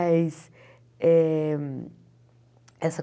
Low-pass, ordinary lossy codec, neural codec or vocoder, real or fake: none; none; none; real